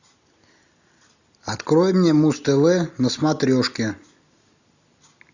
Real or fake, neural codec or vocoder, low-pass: real; none; 7.2 kHz